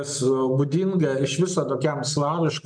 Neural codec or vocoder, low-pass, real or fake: none; 9.9 kHz; real